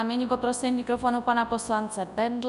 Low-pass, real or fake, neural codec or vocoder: 10.8 kHz; fake; codec, 24 kHz, 0.9 kbps, WavTokenizer, large speech release